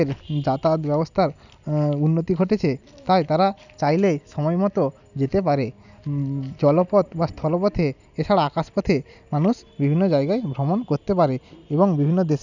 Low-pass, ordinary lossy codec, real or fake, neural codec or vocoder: 7.2 kHz; none; real; none